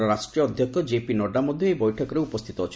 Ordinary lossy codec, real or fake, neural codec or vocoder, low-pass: none; real; none; none